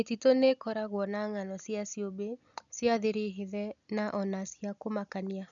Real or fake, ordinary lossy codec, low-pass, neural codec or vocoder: real; none; 7.2 kHz; none